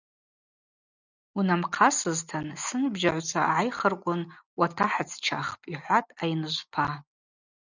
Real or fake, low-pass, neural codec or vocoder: real; 7.2 kHz; none